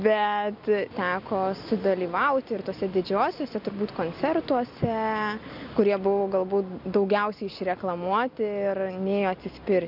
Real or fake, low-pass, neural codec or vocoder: real; 5.4 kHz; none